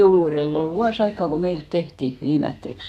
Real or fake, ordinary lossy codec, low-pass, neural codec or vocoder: fake; none; 14.4 kHz; codec, 32 kHz, 1.9 kbps, SNAC